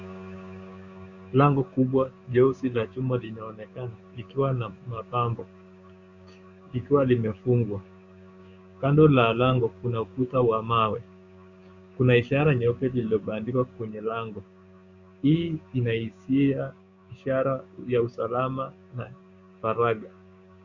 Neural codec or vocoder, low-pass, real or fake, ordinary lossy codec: codec, 16 kHz, 6 kbps, DAC; 7.2 kHz; fake; Opus, 64 kbps